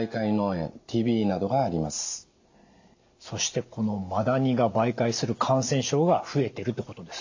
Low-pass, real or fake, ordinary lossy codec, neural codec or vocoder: 7.2 kHz; real; AAC, 48 kbps; none